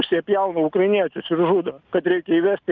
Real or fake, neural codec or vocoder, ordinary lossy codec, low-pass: real; none; Opus, 24 kbps; 7.2 kHz